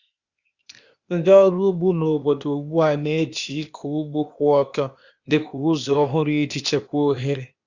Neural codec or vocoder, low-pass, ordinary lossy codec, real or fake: codec, 16 kHz, 0.8 kbps, ZipCodec; 7.2 kHz; Opus, 64 kbps; fake